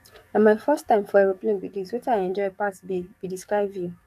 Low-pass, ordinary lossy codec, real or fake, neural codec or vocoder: 14.4 kHz; AAC, 96 kbps; fake; codec, 44.1 kHz, 7.8 kbps, DAC